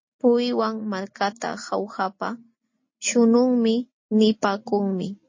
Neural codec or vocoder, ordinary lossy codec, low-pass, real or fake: none; MP3, 32 kbps; 7.2 kHz; real